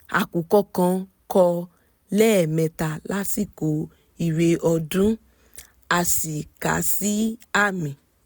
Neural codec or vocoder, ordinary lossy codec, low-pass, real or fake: none; none; none; real